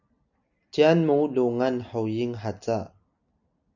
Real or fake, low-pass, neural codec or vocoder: real; 7.2 kHz; none